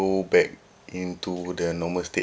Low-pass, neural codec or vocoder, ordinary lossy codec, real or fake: none; none; none; real